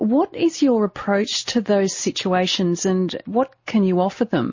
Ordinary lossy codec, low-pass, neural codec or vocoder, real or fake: MP3, 32 kbps; 7.2 kHz; none; real